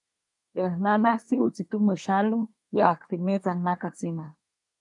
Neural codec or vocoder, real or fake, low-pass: codec, 24 kHz, 1 kbps, SNAC; fake; 10.8 kHz